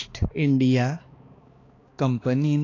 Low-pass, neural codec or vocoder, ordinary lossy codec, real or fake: 7.2 kHz; codec, 16 kHz, 2 kbps, X-Codec, HuBERT features, trained on balanced general audio; AAC, 32 kbps; fake